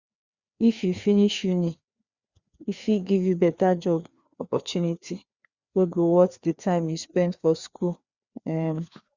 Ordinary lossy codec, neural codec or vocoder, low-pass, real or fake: Opus, 64 kbps; codec, 16 kHz, 2 kbps, FreqCodec, larger model; 7.2 kHz; fake